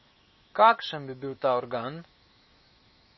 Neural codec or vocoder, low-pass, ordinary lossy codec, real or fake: autoencoder, 48 kHz, 128 numbers a frame, DAC-VAE, trained on Japanese speech; 7.2 kHz; MP3, 24 kbps; fake